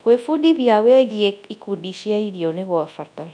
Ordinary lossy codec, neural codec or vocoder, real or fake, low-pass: none; codec, 24 kHz, 0.9 kbps, WavTokenizer, large speech release; fake; 9.9 kHz